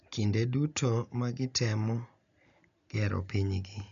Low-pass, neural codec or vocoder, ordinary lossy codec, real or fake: 7.2 kHz; none; none; real